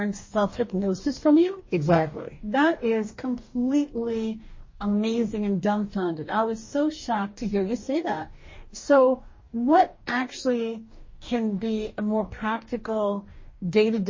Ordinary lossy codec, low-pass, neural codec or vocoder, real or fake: MP3, 32 kbps; 7.2 kHz; codec, 44.1 kHz, 2.6 kbps, DAC; fake